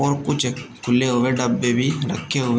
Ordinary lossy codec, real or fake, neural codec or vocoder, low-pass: none; real; none; none